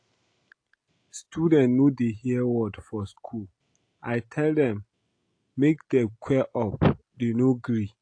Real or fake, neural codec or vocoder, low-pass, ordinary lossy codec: real; none; 9.9 kHz; AAC, 48 kbps